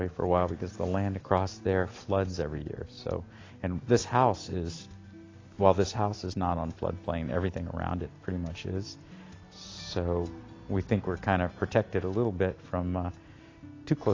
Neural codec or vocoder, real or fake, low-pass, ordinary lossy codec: none; real; 7.2 kHz; AAC, 32 kbps